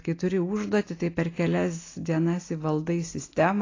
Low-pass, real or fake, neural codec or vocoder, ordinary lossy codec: 7.2 kHz; real; none; AAC, 32 kbps